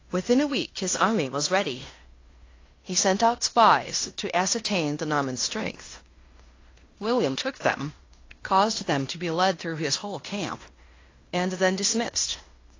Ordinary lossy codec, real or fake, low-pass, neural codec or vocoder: AAC, 32 kbps; fake; 7.2 kHz; codec, 16 kHz in and 24 kHz out, 0.9 kbps, LongCat-Audio-Codec, fine tuned four codebook decoder